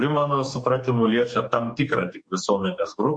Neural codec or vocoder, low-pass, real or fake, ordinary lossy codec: codec, 44.1 kHz, 2.6 kbps, DAC; 9.9 kHz; fake; MP3, 48 kbps